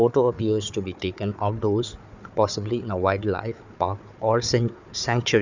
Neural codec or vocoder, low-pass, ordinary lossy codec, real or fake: codec, 16 kHz, 4 kbps, FunCodec, trained on Chinese and English, 50 frames a second; 7.2 kHz; none; fake